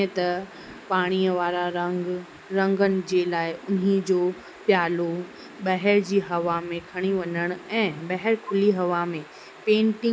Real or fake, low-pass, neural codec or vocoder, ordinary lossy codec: real; none; none; none